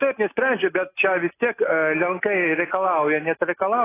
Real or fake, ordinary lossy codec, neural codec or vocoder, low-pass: real; AAC, 16 kbps; none; 3.6 kHz